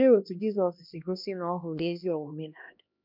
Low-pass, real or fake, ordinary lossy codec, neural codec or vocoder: 5.4 kHz; fake; none; codec, 16 kHz, 1 kbps, X-Codec, HuBERT features, trained on LibriSpeech